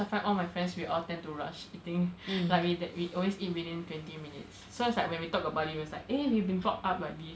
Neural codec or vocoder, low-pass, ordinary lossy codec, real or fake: none; none; none; real